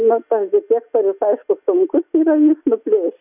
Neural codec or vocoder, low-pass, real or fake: none; 3.6 kHz; real